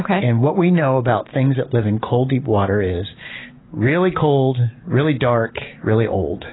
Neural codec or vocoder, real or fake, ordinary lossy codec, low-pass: none; real; AAC, 16 kbps; 7.2 kHz